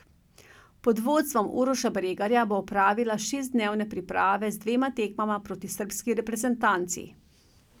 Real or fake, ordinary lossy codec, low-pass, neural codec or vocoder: real; none; 19.8 kHz; none